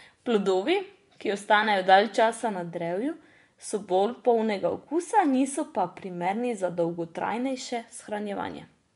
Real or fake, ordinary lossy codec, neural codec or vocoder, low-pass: fake; MP3, 64 kbps; vocoder, 24 kHz, 100 mel bands, Vocos; 10.8 kHz